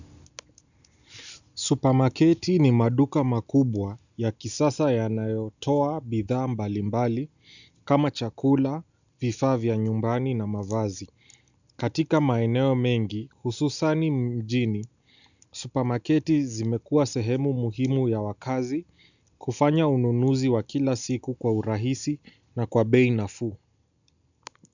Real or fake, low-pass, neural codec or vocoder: real; 7.2 kHz; none